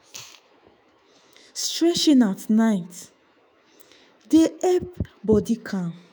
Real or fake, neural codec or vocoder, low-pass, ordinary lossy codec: fake; autoencoder, 48 kHz, 128 numbers a frame, DAC-VAE, trained on Japanese speech; none; none